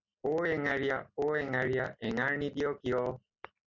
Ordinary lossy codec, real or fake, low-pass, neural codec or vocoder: Opus, 64 kbps; real; 7.2 kHz; none